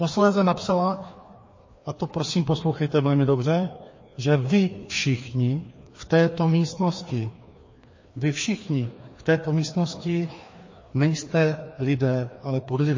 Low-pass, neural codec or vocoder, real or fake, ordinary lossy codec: 7.2 kHz; codec, 16 kHz, 2 kbps, FreqCodec, larger model; fake; MP3, 32 kbps